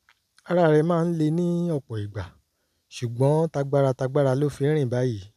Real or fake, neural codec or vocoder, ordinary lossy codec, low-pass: real; none; none; 14.4 kHz